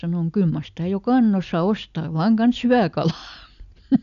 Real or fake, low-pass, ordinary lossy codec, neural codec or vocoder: real; 7.2 kHz; none; none